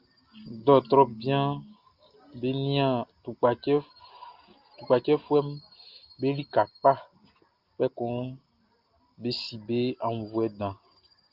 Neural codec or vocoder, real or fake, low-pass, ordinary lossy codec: none; real; 5.4 kHz; Opus, 32 kbps